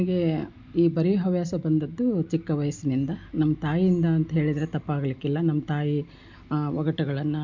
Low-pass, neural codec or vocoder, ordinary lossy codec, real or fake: 7.2 kHz; none; MP3, 64 kbps; real